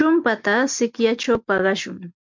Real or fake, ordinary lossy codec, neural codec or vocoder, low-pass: real; MP3, 64 kbps; none; 7.2 kHz